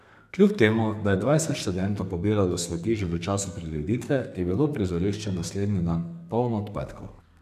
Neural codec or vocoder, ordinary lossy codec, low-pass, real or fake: codec, 32 kHz, 1.9 kbps, SNAC; none; 14.4 kHz; fake